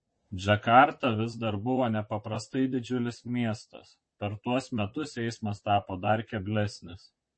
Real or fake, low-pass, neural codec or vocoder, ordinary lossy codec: fake; 9.9 kHz; vocoder, 44.1 kHz, 128 mel bands, Pupu-Vocoder; MP3, 32 kbps